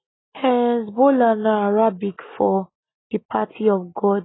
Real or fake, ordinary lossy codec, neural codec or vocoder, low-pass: real; AAC, 16 kbps; none; 7.2 kHz